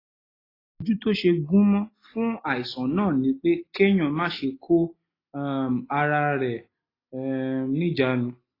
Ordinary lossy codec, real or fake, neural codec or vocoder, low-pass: AAC, 24 kbps; real; none; 5.4 kHz